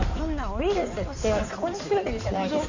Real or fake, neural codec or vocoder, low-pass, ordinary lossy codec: fake; codec, 16 kHz in and 24 kHz out, 2.2 kbps, FireRedTTS-2 codec; 7.2 kHz; none